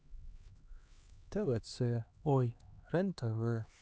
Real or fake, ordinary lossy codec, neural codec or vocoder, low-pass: fake; none; codec, 16 kHz, 2 kbps, X-Codec, HuBERT features, trained on LibriSpeech; none